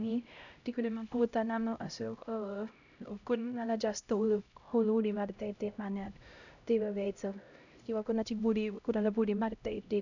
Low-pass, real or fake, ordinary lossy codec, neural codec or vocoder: 7.2 kHz; fake; none; codec, 16 kHz, 0.5 kbps, X-Codec, HuBERT features, trained on LibriSpeech